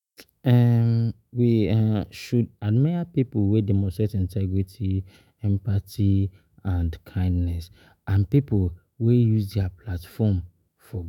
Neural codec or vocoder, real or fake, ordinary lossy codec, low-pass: autoencoder, 48 kHz, 128 numbers a frame, DAC-VAE, trained on Japanese speech; fake; none; 19.8 kHz